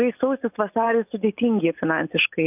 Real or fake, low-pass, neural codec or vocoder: real; 3.6 kHz; none